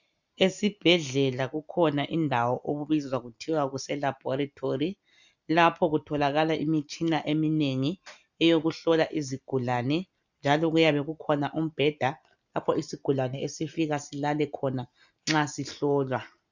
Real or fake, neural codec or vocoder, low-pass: real; none; 7.2 kHz